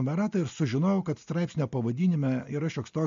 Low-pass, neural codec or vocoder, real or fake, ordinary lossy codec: 7.2 kHz; none; real; MP3, 48 kbps